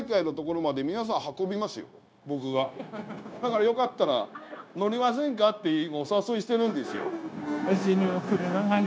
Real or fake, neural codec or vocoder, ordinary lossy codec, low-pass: fake; codec, 16 kHz, 0.9 kbps, LongCat-Audio-Codec; none; none